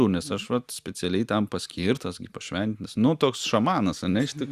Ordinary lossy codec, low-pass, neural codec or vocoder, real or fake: Opus, 64 kbps; 14.4 kHz; none; real